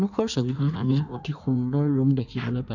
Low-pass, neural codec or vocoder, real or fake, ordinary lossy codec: 7.2 kHz; codec, 16 kHz in and 24 kHz out, 1.1 kbps, FireRedTTS-2 codec; fake; none